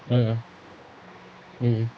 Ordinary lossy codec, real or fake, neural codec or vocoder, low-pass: none; fake; codec, 16 kHz, 2 kbps, X-Codec, HuBERT features, trained on general audio; none